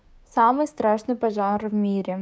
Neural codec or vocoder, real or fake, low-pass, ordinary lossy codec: codec, 16 kHz, 6 kbps, DAC; fake; none; none